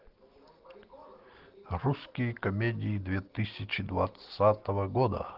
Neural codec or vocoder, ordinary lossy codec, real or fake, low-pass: none; Opus, 16 kbps; real; 5.4 kHz